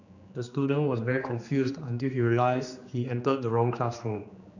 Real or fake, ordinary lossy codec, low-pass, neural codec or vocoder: fake; none; 7.2 kHz; codec, 16 kHz, 2 kbps, X-Codec, HuBERT features, trained on general audio